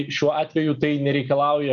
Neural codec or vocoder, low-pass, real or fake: none; 7.2 kHz; real